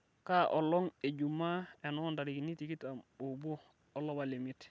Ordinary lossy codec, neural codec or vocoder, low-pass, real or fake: none; none; none; real